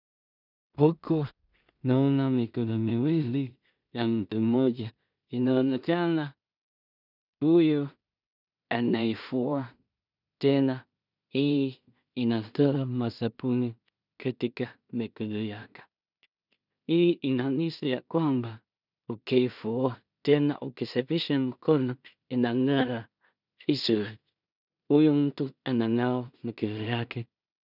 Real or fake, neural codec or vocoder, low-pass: fake; codec, 16 kHz in and 24 kHz out, 0.4 kbps, LongCat-Audio-Codec, two codebook decoder; 5.4 kHz